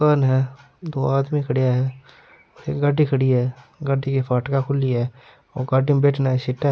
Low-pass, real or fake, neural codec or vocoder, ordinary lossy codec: none; real; none; none